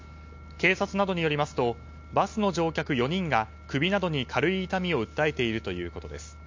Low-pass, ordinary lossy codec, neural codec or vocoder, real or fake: 7.2 kHz; MP3, 48 kbps; none; real